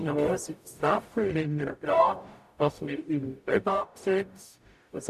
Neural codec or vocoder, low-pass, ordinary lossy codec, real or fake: codec, 44.1 kHz, 0.9 kbps, DAC; 14.4 kHz; none; fake